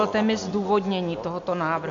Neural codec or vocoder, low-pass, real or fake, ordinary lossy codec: none; 7.2 kHz; real; MP3, 64 kbps